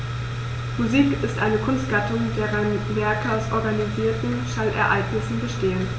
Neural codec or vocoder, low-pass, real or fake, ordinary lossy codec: none; none; real; none